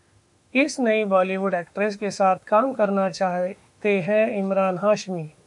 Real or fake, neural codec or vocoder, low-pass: fake; autoencoder, 48 kHz, 32 numbers a frame, DAC-VAE, trained on Japanese speech; 10.8 kHz